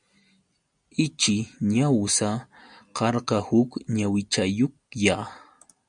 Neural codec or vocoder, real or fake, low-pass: none; real; 9.9 kHz